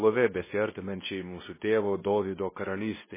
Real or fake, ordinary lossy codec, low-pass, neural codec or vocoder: fake; MP3, 16 kbps; 3.6 kHz; codec, 24 kHz, 0.9 kbps, WavTokenizer, medium speech release version 2